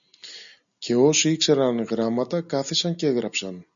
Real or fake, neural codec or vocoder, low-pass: real; none; 7.2 kHz